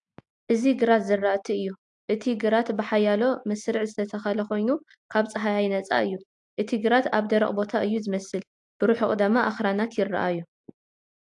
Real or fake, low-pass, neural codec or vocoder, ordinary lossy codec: real; 10.8 kHz; none; AAC, 64 kbps